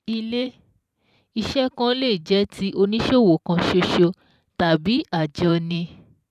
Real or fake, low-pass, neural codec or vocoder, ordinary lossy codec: fake; 14.4 kHz; vocoder, 44.1 kHz, 128 mel bands every 512 samples, BigVGAN v2; none